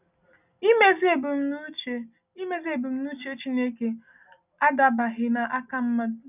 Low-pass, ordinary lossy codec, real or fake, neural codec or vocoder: 3.6 kHz; none; real; none